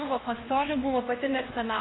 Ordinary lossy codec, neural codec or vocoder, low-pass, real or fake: AAC, 16 kbps; codec, 16 kHz, 1 kbps, X-Codec, HuBERT features, trained on LibriSpeech; 7.2 kHz; fake